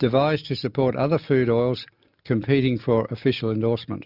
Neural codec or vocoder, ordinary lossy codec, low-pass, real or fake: none; Opus, 64 kbps; 5.4 kHz; real